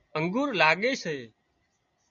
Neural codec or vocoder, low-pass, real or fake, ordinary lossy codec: none; 7.2 kHz; real; MP3, 48 kbps